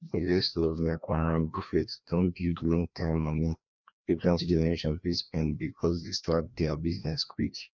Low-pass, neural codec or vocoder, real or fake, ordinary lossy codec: 7.2 kHz; codec, 16 kHz, 1 kbps, FreqCodec, larger model; fake; none